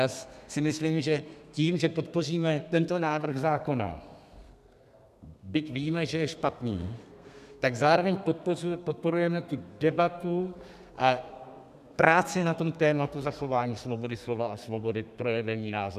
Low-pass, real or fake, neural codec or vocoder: 14.4 kHz; fake; codec, 32 kHz, 1.9 kbps, SNAC